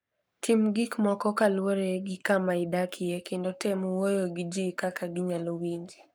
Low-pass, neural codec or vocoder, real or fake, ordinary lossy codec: none; codec, 44.1 kHz, 7.8 kbps, Pupu-Codec; fake; none